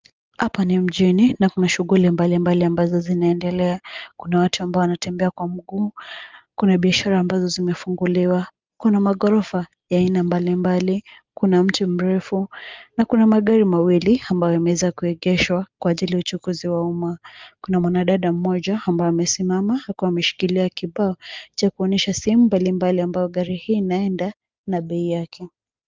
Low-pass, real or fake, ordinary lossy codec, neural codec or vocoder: 7.2 kHz; real; Opus, 32 kbps; none